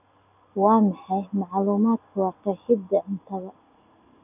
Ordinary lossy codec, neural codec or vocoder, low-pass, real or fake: none; none; 3.6 kHz; real